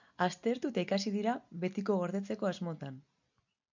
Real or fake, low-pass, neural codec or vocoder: real; 7.2 kHz; none